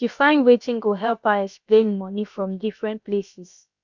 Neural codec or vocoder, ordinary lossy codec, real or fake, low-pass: codec, 16 kHz, about 1 kbps, DyCAST, with the encoder's durations; none; fake; 7.2 kHz